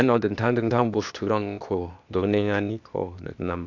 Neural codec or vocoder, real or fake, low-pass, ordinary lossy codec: codec, 16 kHz, 0.8 kbps, ZipCodec; fake; 7.2 kHz; none